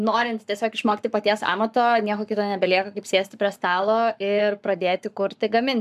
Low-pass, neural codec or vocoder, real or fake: 14.4 kHz; codec, 44.1 kHz, 7.8 kbps, Pupu-Codec; fake